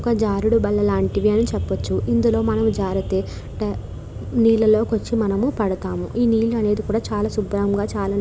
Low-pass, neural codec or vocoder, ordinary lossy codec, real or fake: none; none; none; real